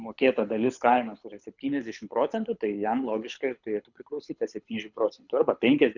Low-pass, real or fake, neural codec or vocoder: 7.2 kHz; fake; codec, 24 kHz, 6 kbps, HILCodec